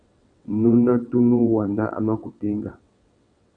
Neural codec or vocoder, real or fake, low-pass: vocoder, 22.05 kHz, 80 mel bands, WaveNeXt; fake; 9.9 kHz